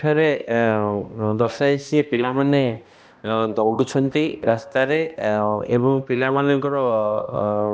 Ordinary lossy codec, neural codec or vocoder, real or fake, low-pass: none; codec, 16 kHz, 1 kbps, X-Codec, HuBERT features, trained on balanced general audio; fake; none